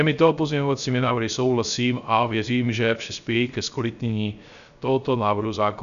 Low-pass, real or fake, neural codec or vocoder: 7.2 kHz; fake; codec, 16 kHz, 0.3 kbps, FocalCodec